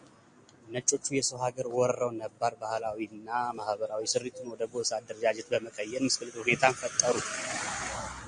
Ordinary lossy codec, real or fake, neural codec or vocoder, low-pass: MP3, 48 kbps; real; none; 9.9 kHz